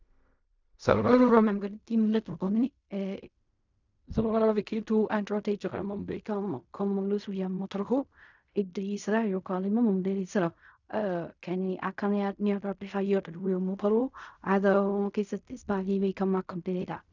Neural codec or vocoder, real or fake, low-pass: codec, 16 kHz in and 24 kHz out, 0.4 kbps, LongCat-Audio-Codec, fine tuned four codebook decoder; fake; 7.2 kHz